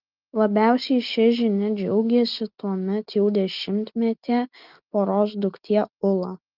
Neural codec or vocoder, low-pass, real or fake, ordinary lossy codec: none; 5.4 kHz; real; Opus, 32 kbps